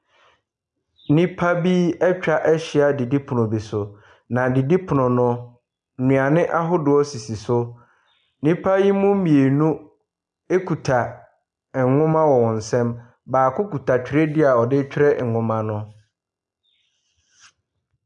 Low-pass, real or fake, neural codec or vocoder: 10.8 kHz; real; none